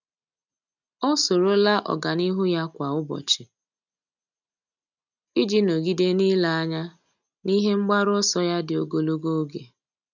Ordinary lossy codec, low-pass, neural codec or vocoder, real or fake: none; 7.2 kHz; none; real